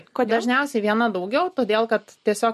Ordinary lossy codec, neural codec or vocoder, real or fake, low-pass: MP3, 64 kbps; none; real; 14.4 kHz